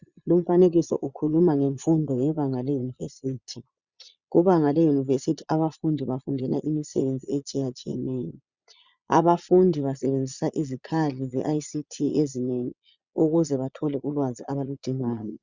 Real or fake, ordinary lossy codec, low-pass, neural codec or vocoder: fake; Opus, 64 kbps; 7.2 kHz; vocoder, 22.05 kHz, 80 mel bands, Vocos